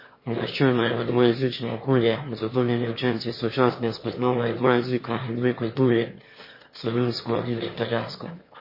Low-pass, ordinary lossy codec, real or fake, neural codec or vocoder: 5.4 kHz; MP3, 24 kbps; fake; autoencoder, 22.05 kHz, a latent of 192 numbers a frame, VITS, trained on one speaker